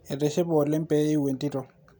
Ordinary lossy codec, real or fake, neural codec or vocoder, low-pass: none; real; none; none